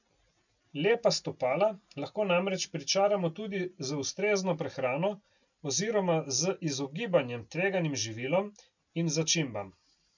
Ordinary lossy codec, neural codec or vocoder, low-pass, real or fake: none; none; 7.2 kHz; real